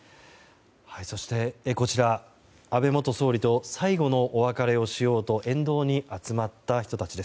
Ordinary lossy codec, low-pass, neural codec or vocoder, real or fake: none; none; none; real